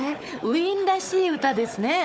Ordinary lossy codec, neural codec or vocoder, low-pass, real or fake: none; codec, 16 kHz, 4 kbps, FunCodec, trained on Chinese and English, 50 frames a second; none; fake